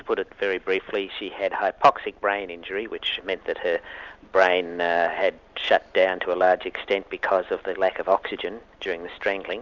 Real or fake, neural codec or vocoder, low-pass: real; none; 7.2 kHz